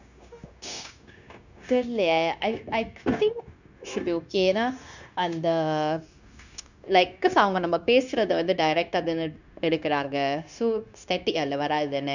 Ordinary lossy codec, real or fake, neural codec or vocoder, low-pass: none; fake; codec, 16 kHz, 0.9 kbps, LongCat-Audio-Codec; 7.2 kHz